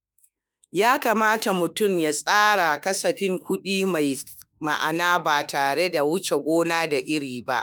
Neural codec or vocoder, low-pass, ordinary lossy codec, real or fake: autoencoder, 48 kHz, 32 numbers a frame, DAC-VAE, trained on Japanese speech; none; none; fake